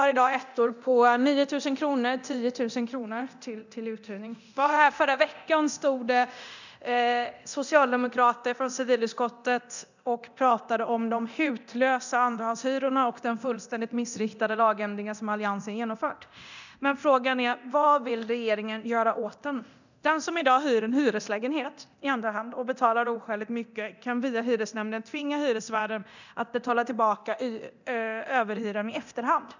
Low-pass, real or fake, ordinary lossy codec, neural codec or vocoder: 7.2 kHz; fake; none; codec, 24 kHz, 0.9 kbps, DualCodec